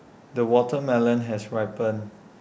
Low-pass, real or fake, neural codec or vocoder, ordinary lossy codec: none; real; none; none